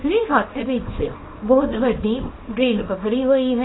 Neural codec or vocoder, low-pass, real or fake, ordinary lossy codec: codec, 24 kHz, 0.9 kbps, WavTokenizer, small release; 7.2 kHz; fake; AAC, 16 kbps